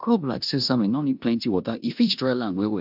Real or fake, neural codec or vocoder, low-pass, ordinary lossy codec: fake; codec, 16 kHz in and 24 kHz out, 0.9 kbps, LongCat-Audio-Codec, four codebook decoder; 5.4 kHz; AAC, 48 kbps